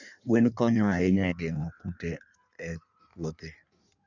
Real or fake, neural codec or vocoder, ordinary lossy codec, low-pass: fake; codec, 16 kHz in and 24 kHz out, 1.1 kbps, FireRedTTS-2 codec; none; 7.2 kHz